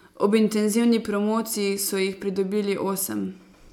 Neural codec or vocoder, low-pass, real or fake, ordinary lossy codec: none; 19.8 kHz; real; none